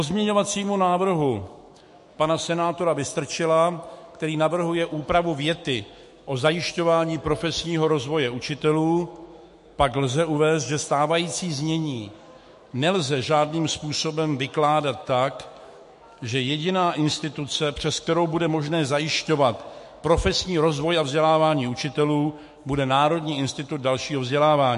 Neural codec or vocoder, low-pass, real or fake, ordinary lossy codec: autoencoder, 48 kHz, 128 numbers a frame, DAC-VAE, trained on Japanese speech; 14.4 kHz; fake; MP3, 48 kbps